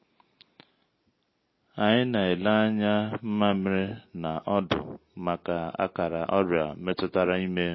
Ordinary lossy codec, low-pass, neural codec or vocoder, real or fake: MP3, 24 kbps; 7.2 kHz; none; real